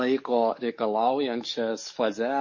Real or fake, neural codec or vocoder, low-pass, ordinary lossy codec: fake; codec, 44.1 kHz, 7.8 kbps, DAC; 7.2 kHz; MP3, 32 kbps